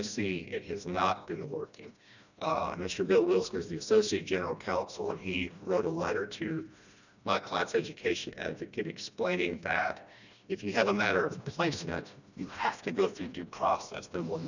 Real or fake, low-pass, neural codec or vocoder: fake; 7.2 kHz; codec, 16 kHz, 1 kbps, FreqCodec, smaller model